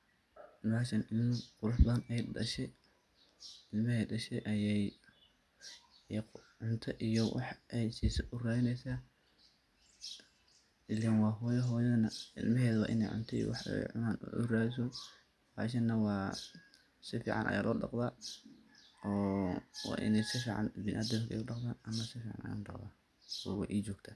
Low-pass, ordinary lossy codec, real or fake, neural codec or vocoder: none; none; real; none